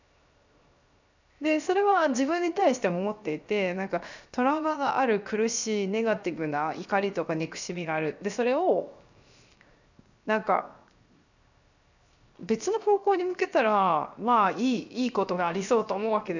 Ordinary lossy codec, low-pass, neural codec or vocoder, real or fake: none; 7.2 kHz; codec, 16 kHz, 0.7 kbps, FocalCodec; fake